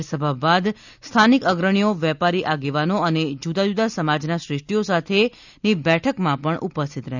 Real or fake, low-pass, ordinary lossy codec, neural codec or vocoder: real; 7.2 kHz; none; none